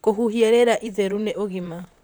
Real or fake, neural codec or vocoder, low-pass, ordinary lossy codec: fake; vocoder, 44.1 kHz, 128 mel bands, Pupu-Vocoder; none; none